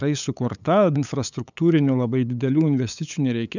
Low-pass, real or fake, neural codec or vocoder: 7.2 kHz; fake; codec, 16 kHz, 8 kbps, FunCodec, trained on LibriTTS, 25 frames a second